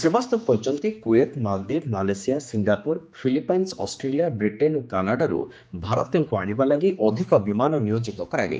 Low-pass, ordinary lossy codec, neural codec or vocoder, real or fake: none; none; codec, 16 kHz, 2 kbps, X-Codec, HuBERT features, trained on general audio; fake